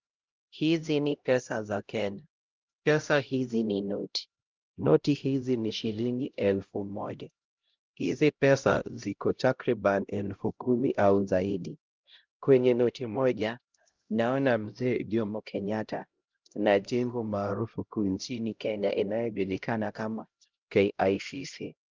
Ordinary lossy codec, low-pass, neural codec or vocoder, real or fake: Opus, 32 kbps; 7.2 kHz; codec, 16 kHz, 0.5 kbps, X-Codec, HuBERT features, trained on LibriSpeech; fake